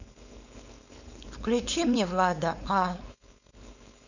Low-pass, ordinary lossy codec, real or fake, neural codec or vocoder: 7.2 kHz; none; fake; codec, 16 kHz, 4.8 kbps, FACodec